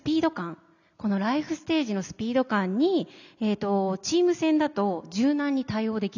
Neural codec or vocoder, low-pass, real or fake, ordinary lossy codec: none; 7.2 kHz; real; none